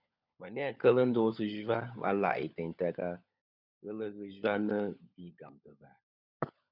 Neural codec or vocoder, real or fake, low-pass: codec, 16 kHz, 16 kbps, FunCodec, trained on LibriTTS, 50 frames a second; fake; 5.4 kHz